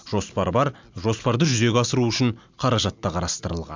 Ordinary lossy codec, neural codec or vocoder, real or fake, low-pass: MP3, 64 kbps; none; real; 7.2 kHz